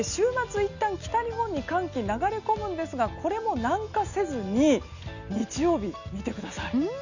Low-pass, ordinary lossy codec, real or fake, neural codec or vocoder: 7.2 kHz; none; real; none